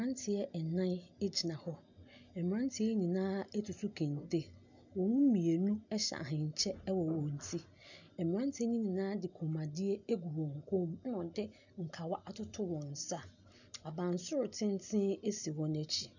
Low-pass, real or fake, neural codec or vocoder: 7.2 kHz; real; none